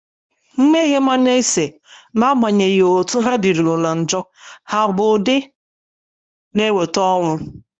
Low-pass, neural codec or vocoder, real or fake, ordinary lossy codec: 9.9 kHz; codec, 24 kHz, 0.9 kbps, WavTokenizer, medium speech release version 1; fake; none